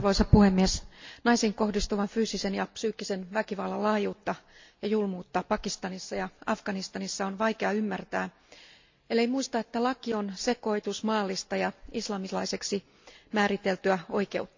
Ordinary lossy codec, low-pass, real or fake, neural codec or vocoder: MP3, 48 kbps; 7.2 kHz; real; none